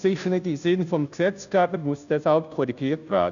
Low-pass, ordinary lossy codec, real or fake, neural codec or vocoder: 7.2 kHz; none; fake; codec, 16 kHz, 0.5 kbps, FunCodec, trained on Chinese and English, 25 frames a second